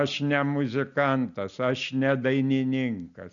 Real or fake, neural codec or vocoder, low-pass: real; none; 7.2 kHz